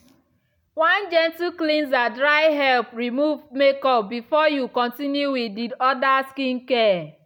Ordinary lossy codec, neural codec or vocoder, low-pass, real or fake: none; none; 19.8 kHz; real